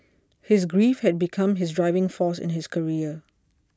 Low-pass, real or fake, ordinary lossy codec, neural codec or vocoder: none; real; none; none